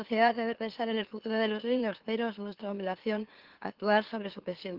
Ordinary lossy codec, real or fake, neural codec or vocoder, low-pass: Opus, 16 kbps; fake; autoencoder, 44.1 kHz, a latent of 192 numbers a frame, MeloTTS; 5.4 kHz